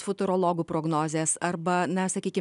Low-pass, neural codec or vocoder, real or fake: 10.8 kHz; none; real